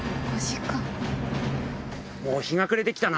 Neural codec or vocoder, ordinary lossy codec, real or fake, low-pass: none; none; real; none